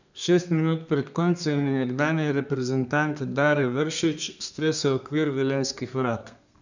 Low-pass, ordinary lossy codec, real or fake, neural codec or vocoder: 7.2 kHz; none; fake; codec, 32 kHz, 1.9 kbps, SNAC